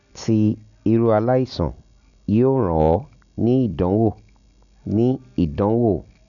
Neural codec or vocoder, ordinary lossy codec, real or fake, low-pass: none; none; real; 7.2 kHz